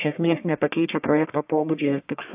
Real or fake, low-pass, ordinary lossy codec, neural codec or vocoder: fake; 3.6 kHz; AAC, 32 kbps; codec, 44.1 kHz, 1.7 kbps, Pupu-Codec